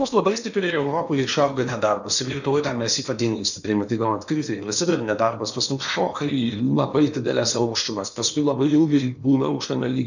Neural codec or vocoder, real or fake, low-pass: codec, 16 kHz in and 24 kHz out, 0.8 kbps, FocalCodec, streaming, 65536 codes; fake; 7.2 kHz